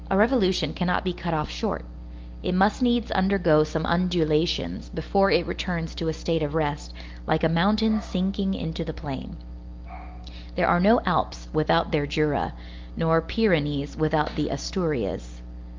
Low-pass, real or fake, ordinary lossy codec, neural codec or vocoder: 7.2 kHz; real; Opus, 24 kbps; none